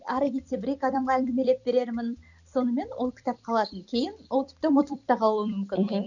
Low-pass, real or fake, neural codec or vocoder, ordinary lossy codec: 7.2 kHz; fake; vocoder, 44.1 kHz, 128 mel bands every 256 samples, BigVGAN v2; none